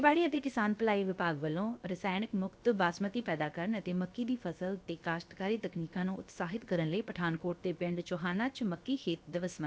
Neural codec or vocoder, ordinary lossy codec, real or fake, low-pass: codec, 16 kHz, about 1 kbps, DyCAST, with the encoder's durations; none; fake; none